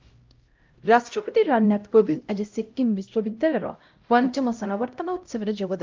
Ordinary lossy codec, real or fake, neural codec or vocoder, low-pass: Opus, 32 kbps; fake; codec, 16 kHz, 0.5 kbps, X-Codec, HuBERT features, trained on LibriSpeech; 7.2 kHz